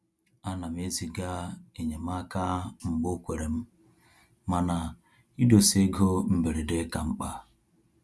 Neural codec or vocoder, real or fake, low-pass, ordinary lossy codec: none; real; none; none